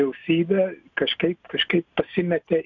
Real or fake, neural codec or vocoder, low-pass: real; none; 7.2 kHz